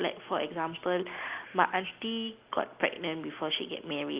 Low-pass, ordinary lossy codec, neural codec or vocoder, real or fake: 3.6 kHz; Opus, 32 kbps; none; real